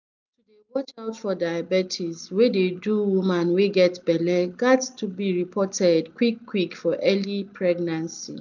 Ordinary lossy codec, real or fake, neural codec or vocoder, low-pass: none; real; none; 7.2 kHz